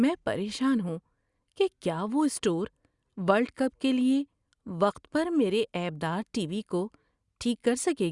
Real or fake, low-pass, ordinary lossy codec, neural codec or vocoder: real; 10.8 kHz; Opus, 64 kbps; none